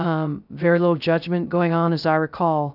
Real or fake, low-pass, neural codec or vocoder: fake; 5.4 kHz; codec, 16 kHz, 0.3 kbps, FocalCodec